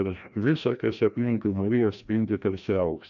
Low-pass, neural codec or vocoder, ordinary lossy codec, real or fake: 7.2 kHz; codec, 16 kHz, 1 kbps, FreqCodec, larger model; MP3, 64 kbps; fake